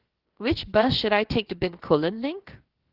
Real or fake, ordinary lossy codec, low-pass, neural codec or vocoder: fake; Opus, 16 kbps; 5.4 kHz; codec, 16 kHz, about 1 kbps, DyCAST, with the encoder's durations